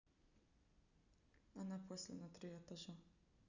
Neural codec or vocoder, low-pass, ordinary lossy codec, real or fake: none; 7.2 kHz; none; real